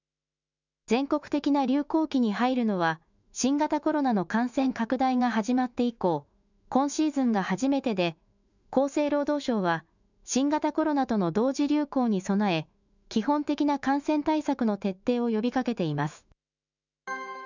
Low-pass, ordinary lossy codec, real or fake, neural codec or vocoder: 7.2 kHz; none; fake; autoencoder, 48 kHz, 128 numbers a frame, DAC-VAE, trained on Japanese speech